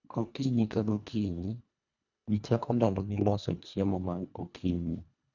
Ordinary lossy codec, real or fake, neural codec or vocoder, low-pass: none; fake; codec, 24 kHz, 1.5 kbps, HILCodec; 7.2 kHz